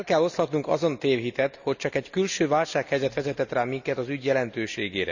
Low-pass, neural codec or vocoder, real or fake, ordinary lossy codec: 7.2 kHz; none; real; none